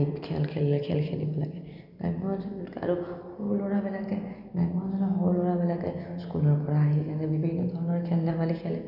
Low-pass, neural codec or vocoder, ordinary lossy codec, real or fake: 5.4 kHz; none; none; real